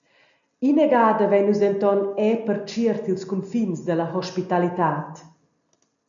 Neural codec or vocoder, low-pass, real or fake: none; 7.2 kHz; real